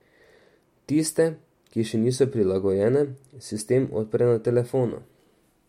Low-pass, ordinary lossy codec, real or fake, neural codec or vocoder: 19.8 kHz; MP3, 64 kbps; real; none